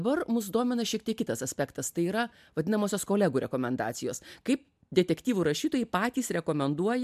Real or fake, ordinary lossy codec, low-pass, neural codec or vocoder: real; MP3, 96 kbps; 14.4 kHz; none